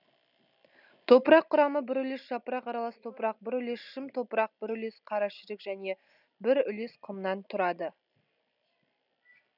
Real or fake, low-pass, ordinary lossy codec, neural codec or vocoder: real; 5.4 kHz; none; none